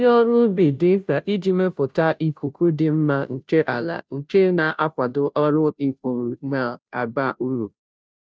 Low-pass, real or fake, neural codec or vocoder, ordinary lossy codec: none; fake; codec, 16 kHz, 0.5 kbps, FunCodec, trained on Chinese and English, 25 frames a second; none